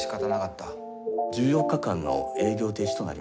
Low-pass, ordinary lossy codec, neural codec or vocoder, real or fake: none; none; none; real